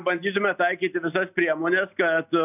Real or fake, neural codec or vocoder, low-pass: real; none; 3.6 kHz